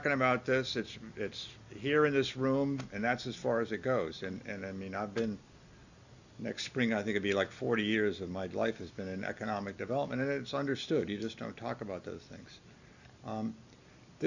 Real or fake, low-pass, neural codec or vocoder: real; 7.2 kHz; none